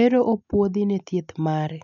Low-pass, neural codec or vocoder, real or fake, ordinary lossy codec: 7.2 kHz; none; real; none